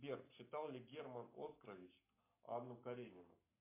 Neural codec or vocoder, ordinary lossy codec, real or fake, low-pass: codec, 44.1 kHz, 7.8 kbps, Pupu-Codec; MP3, 24 kbps; fake; 3.6 kHz